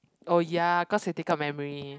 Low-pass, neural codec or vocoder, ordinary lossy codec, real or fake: none; none; none; real